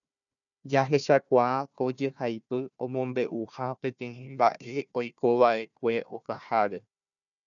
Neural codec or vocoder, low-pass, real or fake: codec, 16 kHz, 1 kbps, FunCodec, trained on Chinese and English, 50 frames a second; 7.2 kHz; fake